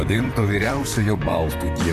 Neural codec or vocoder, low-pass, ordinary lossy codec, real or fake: vocoder, 44.1 kHz, 128 mel bands, Pupu-Vocoder; 14.4 kHz; AAC, 96 kbps; fake